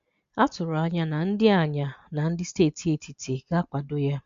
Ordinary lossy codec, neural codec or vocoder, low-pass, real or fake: Opus, 64 kbps; none; 7.2 kHz; real